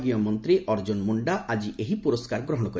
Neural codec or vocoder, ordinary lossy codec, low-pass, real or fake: none; none; none; real